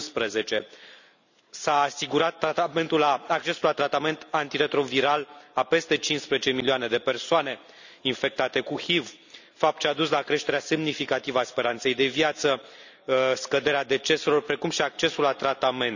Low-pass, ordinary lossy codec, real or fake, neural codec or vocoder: 7.2 kHz; none; real; none